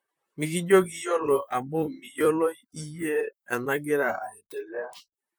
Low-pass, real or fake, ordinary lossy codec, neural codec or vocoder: none; fake; none; vocoder, 44.1 kHz, 128 mel bands, Pupu-Vocoder